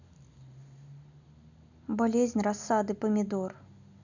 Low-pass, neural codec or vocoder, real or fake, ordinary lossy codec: 7.2 kHz; none; real; none